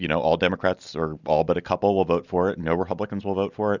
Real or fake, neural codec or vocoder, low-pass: real; none; 7.2 kHz